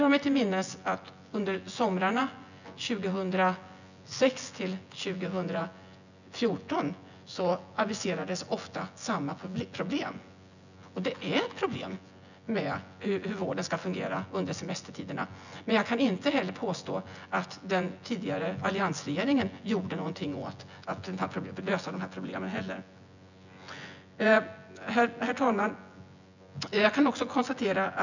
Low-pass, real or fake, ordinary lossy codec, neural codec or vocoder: 7.2 kHz; fake; none; vocoder, 24 kHz, 100 mel bands, Vocos